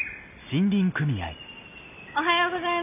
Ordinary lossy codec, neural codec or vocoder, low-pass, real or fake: none; none; 3.6 kHz; real